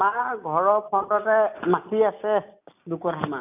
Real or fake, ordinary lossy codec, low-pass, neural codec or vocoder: real; none; 3.6 kHz; none